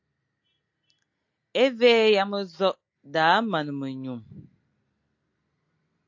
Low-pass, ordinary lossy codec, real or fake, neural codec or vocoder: 7.2 kHz; AAC, 48 kbps; real; none